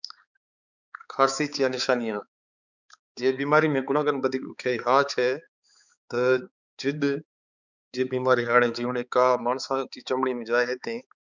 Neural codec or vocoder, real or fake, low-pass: codec, 16 kHz, 4 kbps, X-Codec, HuBERT features, trained on balanced general audio; fake; 7.2 kHz